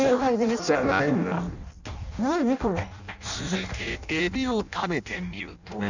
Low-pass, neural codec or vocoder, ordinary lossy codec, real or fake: 7.2 kHz; codec, 16 kHz in and 24 kHz out, 0.6 kbps, FireRedTTS-2 codec; none; fake